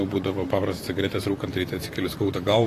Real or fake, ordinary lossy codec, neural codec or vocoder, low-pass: fake; AAC, 48 kbps; vocoder, 44.1 kHz, 128 mel bands, Pupu-Vocoder; 14.4 kHz